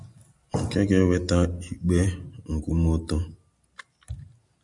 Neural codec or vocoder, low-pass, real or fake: none; 10.8 kHz; real